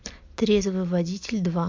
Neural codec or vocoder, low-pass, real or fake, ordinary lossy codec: none; 7.2 kHz; real; MP3, 48 kbps